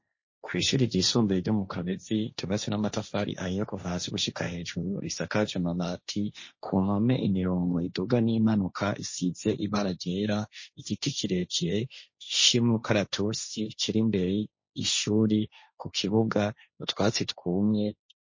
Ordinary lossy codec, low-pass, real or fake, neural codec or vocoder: MP3, 32 kbps; 7.2 kHz; fake; codec, 16 kHz, 1.1 kbps, Voila-Tokenizer